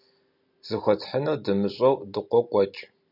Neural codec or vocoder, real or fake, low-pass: none; real; 5.4 kHz